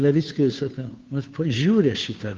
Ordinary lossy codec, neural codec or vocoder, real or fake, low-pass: Opus, 32 kbps; codec, 16 kHz, 2 kbps, FunCodec, trained on Chinese and English, 25 frames a second; fake; 7.2 kHz